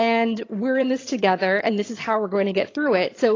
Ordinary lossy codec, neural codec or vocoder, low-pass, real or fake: AAC, 32 kbps; vocoder, 22.05 kHz, 80 mel bands, HiFi-GAN; 7.2 kHz; fake